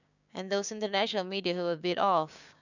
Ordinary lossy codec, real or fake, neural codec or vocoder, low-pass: none; fake; codec, 16 kHz, 6 kbps, DAC; 7.2 kHz